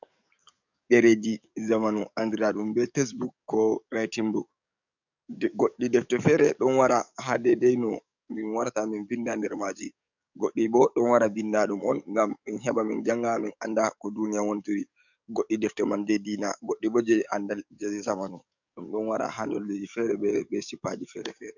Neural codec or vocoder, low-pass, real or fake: codec, 44.1 kHz, 7.8 kbps, DAC; 7.2 kHz; fake